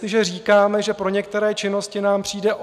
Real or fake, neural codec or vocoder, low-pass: real; none; 14.4 kHz